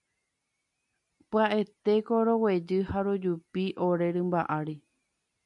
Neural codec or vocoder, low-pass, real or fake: none; 10.8 kHz; real